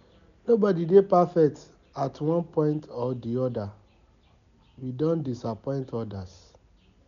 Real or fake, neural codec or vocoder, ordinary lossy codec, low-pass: real; none; none; 7.2 kHz